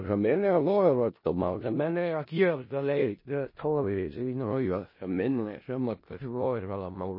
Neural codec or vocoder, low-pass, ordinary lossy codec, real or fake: codec, 16 kHz in and 24 kHz out, 0.4 kbps, LongCat-Audio-Codec, four codebook decoder; 5.4 kHz; MP3, 24 kbps; fake